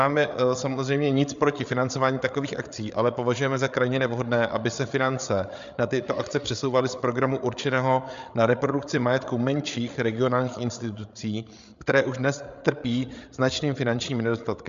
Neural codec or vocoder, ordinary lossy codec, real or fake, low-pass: codec, 16 kHz, 8 kbps, FreqCodec, larger model; MP3, 64 kbps; fake; 7.2 kHz